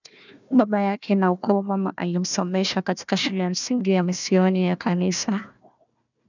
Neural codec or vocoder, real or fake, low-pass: codec, 16 kHz, 1 kbps, FunCodec, trained on Chinese and English, 50 frames a second; fake; 7.2 kHz